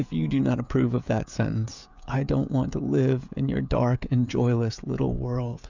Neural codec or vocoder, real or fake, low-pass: codec, 16 kHz, 16 kbps, FreqCodec, smaller model; fake; 7.2 kHz